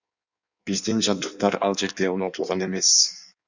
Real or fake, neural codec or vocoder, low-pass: fake; codec, 16 kHz in and 24 kHz out, 1.1 kbps, FireRedTTS-2 codec; 7.2 kHz